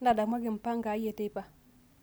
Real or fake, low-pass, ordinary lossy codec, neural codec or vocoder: real; none; none; none